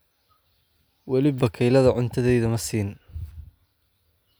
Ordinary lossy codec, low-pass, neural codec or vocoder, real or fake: none; none; none; real